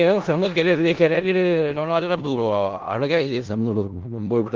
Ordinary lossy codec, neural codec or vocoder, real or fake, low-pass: Opus, 16 kbps; codec, 16 kHz in and 24 kHz out, 0.4 kbps, LongCat-Audio-Codec, four codebook decoder; fake; 7.2 kHz